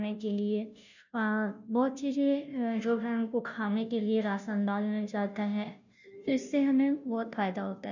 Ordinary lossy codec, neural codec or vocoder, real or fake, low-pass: none; codec, 16 kHz, 0.5 kbps, FunCodec, trained on Chinese and English, 25 frames a second; fake; 7.2 kHz